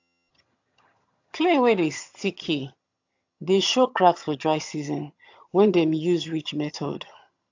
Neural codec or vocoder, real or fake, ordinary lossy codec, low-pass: vocoder, 22.05 kHz, 80 mel bands, HiFi-GAN; fake; AAC, 48 kbps; 7.2 kHz